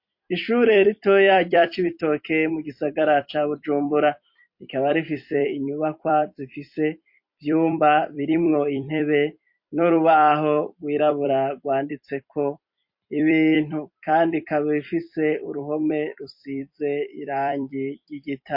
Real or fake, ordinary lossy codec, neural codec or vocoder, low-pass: fake; MP3, 32 kbps; vocoder, 44.1 kHz, 128 mel bands every 256 samples, BigVGAN v2; 5.4 kHz